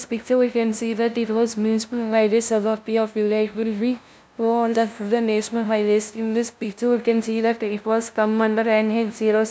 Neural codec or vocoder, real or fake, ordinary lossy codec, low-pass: codec, 16 kHz, 0.5 kbps, FunCodec, trained on LibriTTS, 25 frames a second; fake; none; none